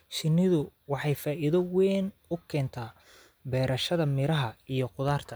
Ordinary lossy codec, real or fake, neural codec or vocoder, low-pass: none; real; none; none